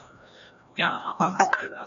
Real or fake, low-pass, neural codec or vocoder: fake; 7.2 kHz; codec, 16 kHz, 1 kbps, FreqCodec, larger model